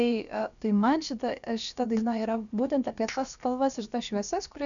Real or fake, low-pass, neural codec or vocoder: fake; 7.2 kHz; codec, 16 kHz, about 1 kbps, DyCAST, with the encoder's durations